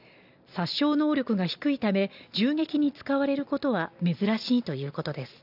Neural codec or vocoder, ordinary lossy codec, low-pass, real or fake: none; none; 5.4 kHz; real